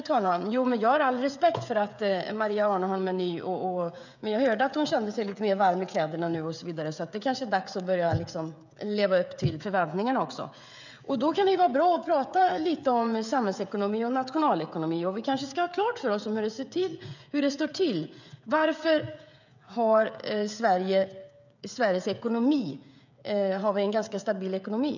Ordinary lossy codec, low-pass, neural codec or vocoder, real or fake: none; 7.2 kHz; codec, 16 kHz, 16 kbps, FreqCodec, smaller model; fake